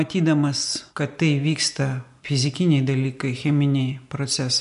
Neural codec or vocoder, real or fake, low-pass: none; real; 10.8 kHz